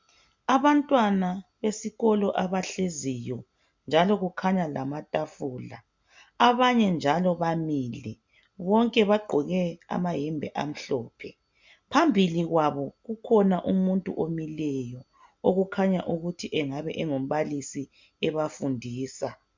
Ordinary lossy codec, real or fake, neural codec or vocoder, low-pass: MP3, 64 kbps; real; none; 7.2 kHz